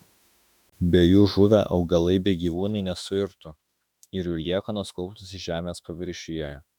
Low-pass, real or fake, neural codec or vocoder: 19.8 kHz; fake; autoencoder, 48 kHz, 32 numbers a frame, DAC-VAE, trained on Japanese speech